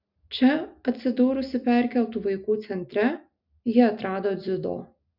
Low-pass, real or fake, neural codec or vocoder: 5.4 kHz; real; none